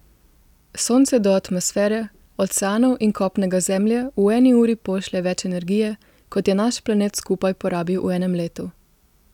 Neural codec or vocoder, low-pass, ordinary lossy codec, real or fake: none; 19.8 kHz; none; real